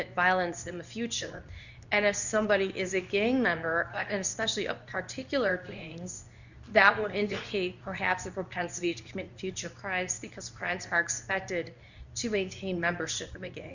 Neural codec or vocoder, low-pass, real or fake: codec, 24 kHz, 0.9 kbps, WavTokenizer, medium speech release version 2; 7.2 kHz; fake